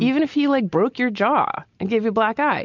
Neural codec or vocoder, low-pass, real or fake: none; 7.2 kHz; real